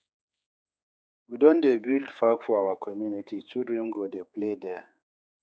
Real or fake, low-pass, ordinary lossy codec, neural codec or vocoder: fake; none; none; codec, 16 kHz, 4 kbps, X-Codec, HuBERT features, trained on general audio